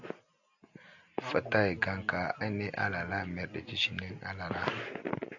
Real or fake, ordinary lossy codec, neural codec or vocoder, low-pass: real; AAC, 48 kbps; none; 7.2 kHz